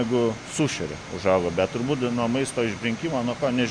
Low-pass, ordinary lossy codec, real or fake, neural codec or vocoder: 9.9 kHz; Opus, 64 kbps; real; none